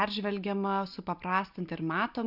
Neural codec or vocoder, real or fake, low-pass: none; real; 5.4 kHz